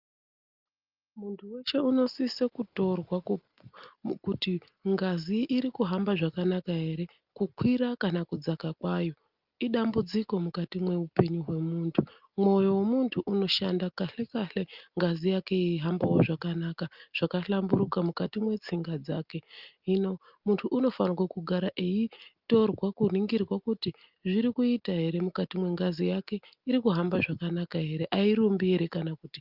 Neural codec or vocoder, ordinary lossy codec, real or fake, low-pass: none; Opus, 24 kbps; real; 5.4 kHz